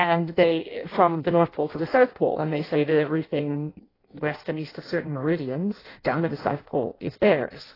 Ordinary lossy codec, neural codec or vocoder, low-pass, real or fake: AAC, 24 kbps; codec, 16 kHz in and 24 kHz out, 0.6 kbps, FireRedTTS-2 codec; 5.4 kHz; fake